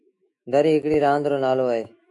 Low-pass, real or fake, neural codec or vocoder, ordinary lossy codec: 10.8 kHz; fake; autoencoder, 48 kHz, 128 numbers a frame, DAC-VAE, trained on Japanese speech; MP3, 64 kbps